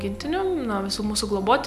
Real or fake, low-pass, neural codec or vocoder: real; 14.4 kHz; none